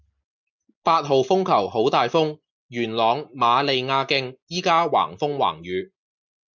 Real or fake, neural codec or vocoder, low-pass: real; none; 7.2 kHz